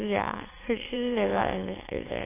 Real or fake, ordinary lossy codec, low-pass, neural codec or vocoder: fake; AAC, 16 kbps; 3.6 kHz; autoencoder, 22.05 kHz, a latent of 192 numbers a frame, VITS, trained on many speakers